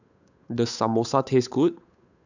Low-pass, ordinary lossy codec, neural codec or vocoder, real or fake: 7.2 kHz; none; codec, 16 kHz, 8 kbps, FunCodec, trained on Chinese and English, 25 frames a second; fake